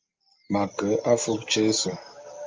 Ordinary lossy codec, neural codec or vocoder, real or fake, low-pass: Opus, 24 kbps; none; real; 7.2 kHz